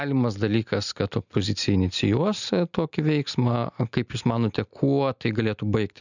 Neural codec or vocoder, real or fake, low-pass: none; real; 7.2 kHz